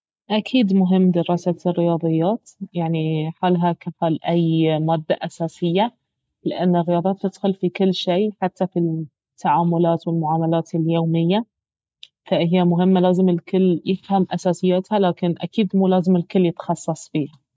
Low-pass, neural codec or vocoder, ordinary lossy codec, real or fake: none; none; none; real